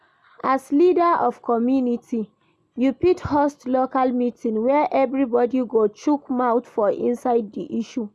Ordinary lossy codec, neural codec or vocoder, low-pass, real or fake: none; none; none; real